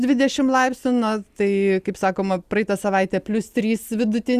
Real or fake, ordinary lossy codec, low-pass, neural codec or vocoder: real; Opus, 64 kbps; 14.4 kHz; none